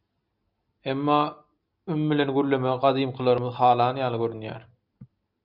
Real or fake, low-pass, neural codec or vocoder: real; 5.4 kHz; none